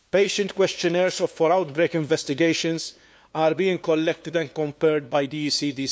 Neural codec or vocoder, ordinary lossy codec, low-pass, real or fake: codec, 16 kHz, 2 kbps, FunCodec, trained on LibriTTS, 25 frames a second; none; none; fake